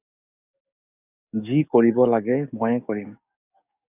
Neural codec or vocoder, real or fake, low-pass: codec, 44.1 kHz, 7.8 kbps, DAC; fake; 3.6 kHz